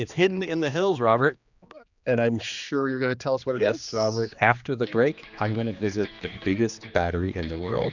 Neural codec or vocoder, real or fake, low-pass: codec, 16 kHz, 2 kbps, X-Codec, HuBERT features, trained on general audio; fake; 7.2 kHz